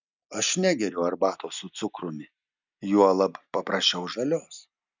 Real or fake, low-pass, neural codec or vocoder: real; 7.2 kHz; none